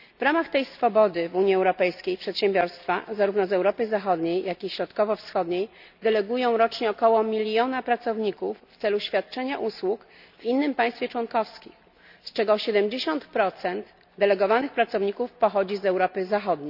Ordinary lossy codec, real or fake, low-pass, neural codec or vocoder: none; real; 5.4 kHz; none